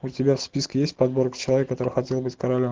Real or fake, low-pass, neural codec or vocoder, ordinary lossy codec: real; 7.2 kHz; none; Opus, 16 kbps